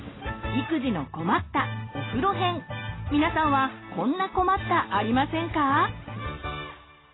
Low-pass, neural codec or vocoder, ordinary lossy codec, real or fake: 7.2 kHz; none; AAC, 16 kbps; real